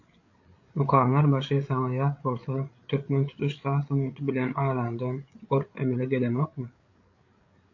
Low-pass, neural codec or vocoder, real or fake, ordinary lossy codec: 7.2 kHz; codec, 16 kHz, 8 kbps, FreqCodec, larger model; fake; AAC, 48 kbps